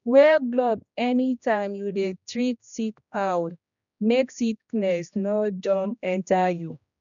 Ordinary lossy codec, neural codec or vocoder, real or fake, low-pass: none; codec, 16 kHz, 1 kbps, X-Codec, HuBERT features, trained on general audio; fake; 7.2 kHz